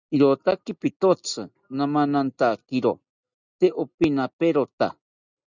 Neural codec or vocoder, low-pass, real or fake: none; 7.2 kHz; real